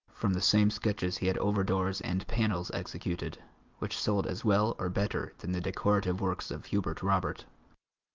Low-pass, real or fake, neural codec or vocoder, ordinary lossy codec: 7.2 kHz; real; none; Opus, 24 kbps